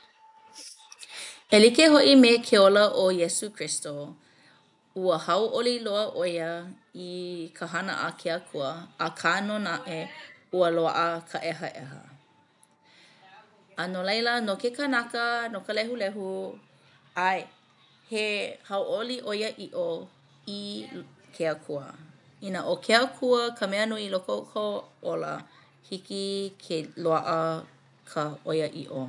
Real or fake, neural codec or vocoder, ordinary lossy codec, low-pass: real; none; none; 10.8 kHz